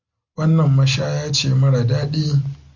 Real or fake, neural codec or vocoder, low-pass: real; none; 7.2 kHz